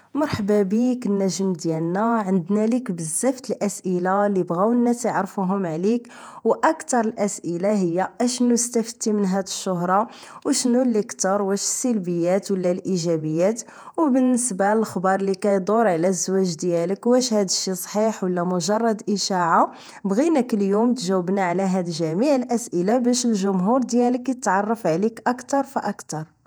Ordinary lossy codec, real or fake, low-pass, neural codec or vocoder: none; fake; none; vocoder, 48 kHz, 128 mel bands, Vocos